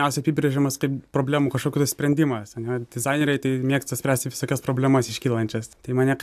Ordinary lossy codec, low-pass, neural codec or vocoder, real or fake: AAC, 96 kbps; 14.4 kHz; none; real